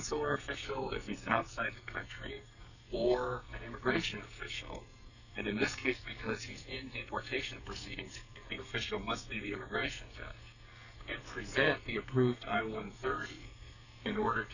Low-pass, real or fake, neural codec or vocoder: 7.2 kHz; fake; codec, 44.1 kHz, 2.6 kbps, SNAC